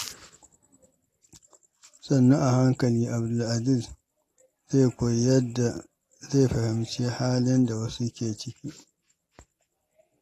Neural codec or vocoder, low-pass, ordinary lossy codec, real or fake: none; 14.4 kHz; AAC, 48 kbps; real